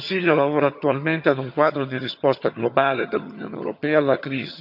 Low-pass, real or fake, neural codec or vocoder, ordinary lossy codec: 5.4 kHz; fake; vocoder, 22.05 kHz, 80 mel bands, HiFi-GAN; none